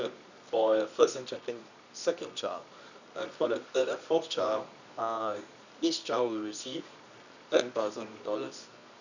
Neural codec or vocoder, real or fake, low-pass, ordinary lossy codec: codec, 24 kHz, 0.9 kbps, WavTokenizer, medium music audio release; fake; 7.2 kHz; none